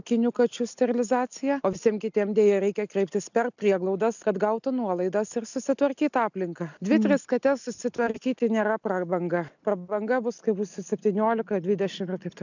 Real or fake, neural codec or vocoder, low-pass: real; none; 7.2 kHz